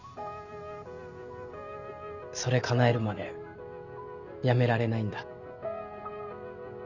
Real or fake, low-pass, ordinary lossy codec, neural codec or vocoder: real; 7.2 kHz; none; none